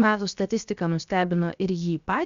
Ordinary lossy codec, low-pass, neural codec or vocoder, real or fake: Opus, 64 kbps; 7.2 kHz; codec, 16 kHz, 0.7 kbps, FocalCodec; fake